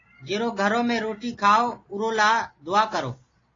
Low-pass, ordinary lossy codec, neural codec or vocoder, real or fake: 7.2 kHz; AAC, 32 kbps; none; real